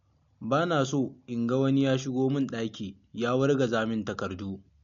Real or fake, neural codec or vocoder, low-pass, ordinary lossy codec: real; none; 7.2 kHz; MP3, 48 kbps